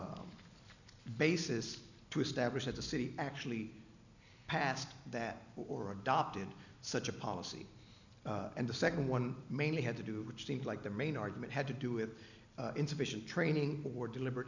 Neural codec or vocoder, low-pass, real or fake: none; 7.2 kHz; real